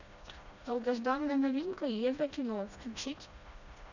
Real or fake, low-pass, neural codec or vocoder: fake; 7.2 kHz; codec, 16 kHz, 1 kbps, FreqCodec, smaller model